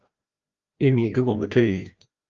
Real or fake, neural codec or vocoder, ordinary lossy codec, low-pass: fake; codec, 16 kHz, 1 kbps, FreqCodec, larger model; Opus, 32 kbps; 7.2 kHz